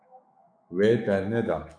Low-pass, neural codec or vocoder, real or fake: 9.9 kHz; autoencoder, 48 kHz, 128 numbers a frame, DAC-VAE, trained on Japanese speech; fake